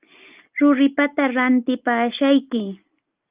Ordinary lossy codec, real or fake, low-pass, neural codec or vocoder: Opus, 32 kbps; real; 3.6 kHz; none